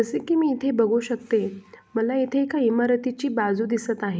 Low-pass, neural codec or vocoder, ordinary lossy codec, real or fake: none; none; none; real